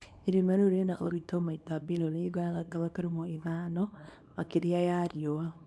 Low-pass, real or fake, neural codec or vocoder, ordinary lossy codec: none; fake; codec, 24 kHz, 0.9 kbps, WavTokenizer, small release; none